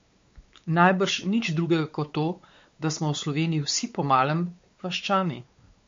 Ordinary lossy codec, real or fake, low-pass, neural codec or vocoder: MP3, 48 kbps; fake; 7.2 kHz; codec, 16 kHz, 4 kbps, X-Codec, WavLM features, trained on Multilingual LibriSpeech